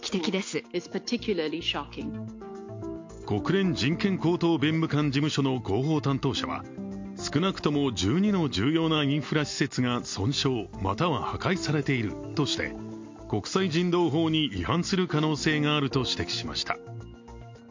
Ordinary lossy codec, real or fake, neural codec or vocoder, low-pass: MP3, 48 kbps; real; none; 7.2 kHz